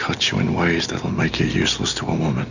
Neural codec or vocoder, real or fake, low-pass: none; real; 7.2 kHz